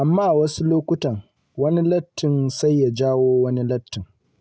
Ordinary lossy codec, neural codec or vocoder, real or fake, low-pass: none; none; real; none